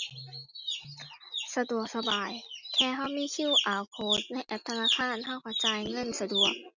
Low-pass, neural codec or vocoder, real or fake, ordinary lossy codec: 7.2 kHz; none; real; none